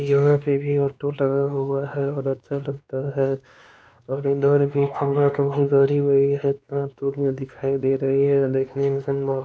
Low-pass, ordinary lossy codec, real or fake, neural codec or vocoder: none; none; fake; codec, 16 kHz, 2 kbps, X-Codec, WavLM features, trained on Multilingual LibriSpeech